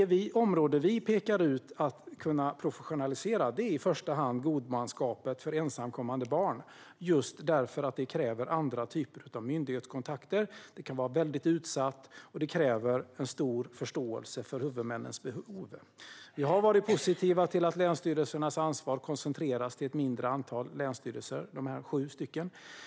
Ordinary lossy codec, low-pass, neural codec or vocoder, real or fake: none; none; none; real